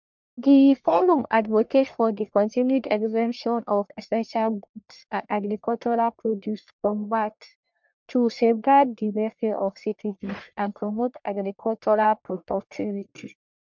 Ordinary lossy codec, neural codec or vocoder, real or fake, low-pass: MP3, 64 kbps; codec, 44.1 kHz, 1.7 kbps, Pupu-Codec; fake; 7.2 kHz